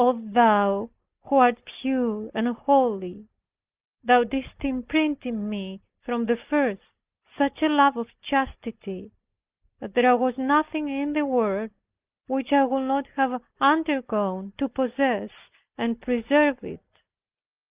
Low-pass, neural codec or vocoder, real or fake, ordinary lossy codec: 3.6 kHz; none; real; Opus, 24 kbps